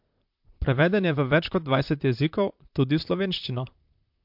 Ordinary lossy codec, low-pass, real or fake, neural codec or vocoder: MP3, 48 kbps; 5.4 kHz; fake; vocoder, 44.1 kHz, 128 mel bands, Pupu-Vocoder